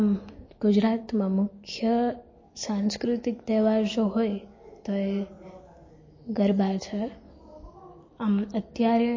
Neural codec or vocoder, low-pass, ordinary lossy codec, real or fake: none; 7.2 kHz; MP3, 32 kbps; real